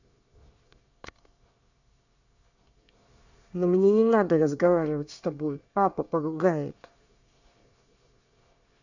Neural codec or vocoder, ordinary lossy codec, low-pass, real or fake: codec, 24 kHz, 1 kbps, SNAC; none; 7.2 kHz; fake